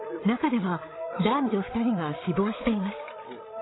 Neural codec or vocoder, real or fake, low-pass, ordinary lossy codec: codec, 16 kHz, 16 kbps, FreqCodec, larger model; fake; 7.2 kHz; AAC, 16 kbps